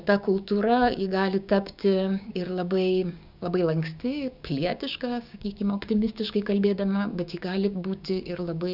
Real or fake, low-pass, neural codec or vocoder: fake; 5.4 kHz; codec, 16 kHz, 6 kbps, DAC